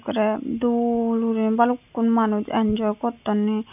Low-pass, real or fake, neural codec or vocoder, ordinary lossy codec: 3.6 kHz; real; none; none